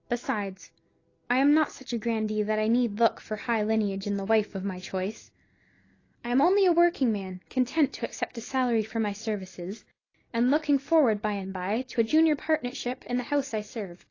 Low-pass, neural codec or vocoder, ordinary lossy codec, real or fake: 7.2 kHz; none; AAC, 32 kbps; real